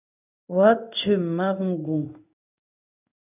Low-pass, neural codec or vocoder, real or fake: 3.6 kHz; codec, 16 kHz in and 24 kHz out, 1 kbps, XY-Tokenizer; fake